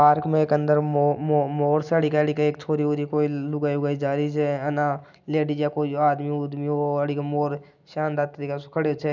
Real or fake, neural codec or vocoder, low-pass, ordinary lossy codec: real; none; 7.2 kHz; none